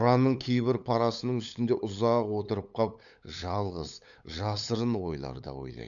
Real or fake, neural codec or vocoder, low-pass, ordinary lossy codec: fake; codec, 16 kHz, 8 kbps, FunCodec, trained on LibriTTS, 25 frames a second; 7.2 kHz; none